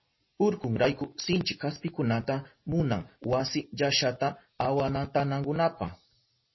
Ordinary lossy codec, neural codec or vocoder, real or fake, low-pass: MP3, 24 kbps; none; real; 7.2 kHz